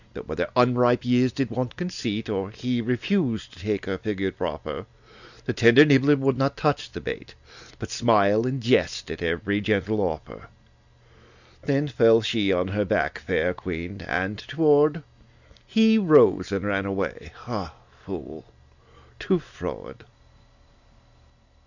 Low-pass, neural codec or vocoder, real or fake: 7.2 kHz; none; real